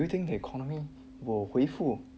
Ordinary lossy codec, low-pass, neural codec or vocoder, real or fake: none; none; none; real